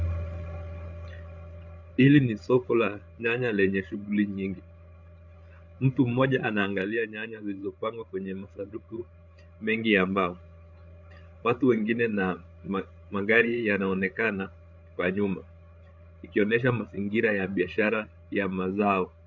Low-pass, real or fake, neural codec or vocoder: 7.2 kHz; fake; codec, 16 kHz, 16 kbps, FreqCodec, larger model